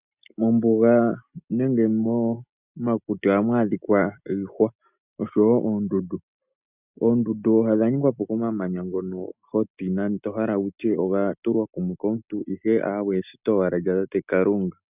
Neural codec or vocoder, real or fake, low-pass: none; real; 3.6 kHz